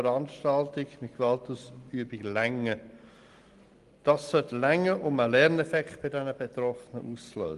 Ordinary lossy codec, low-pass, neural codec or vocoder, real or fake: Opus, 24 kbps; 10.8 kHz; none; real